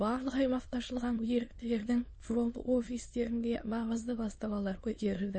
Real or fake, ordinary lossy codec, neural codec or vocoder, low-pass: fake; MP3, 32 kbps; autoencoder, 22.05 kHz, a latent of 192 numbers a frame, VITS, trained on many speakers; 9.9 kHz